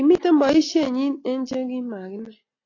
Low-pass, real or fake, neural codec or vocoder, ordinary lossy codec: 7.2 kHz; real; none; AAC, 32 kbps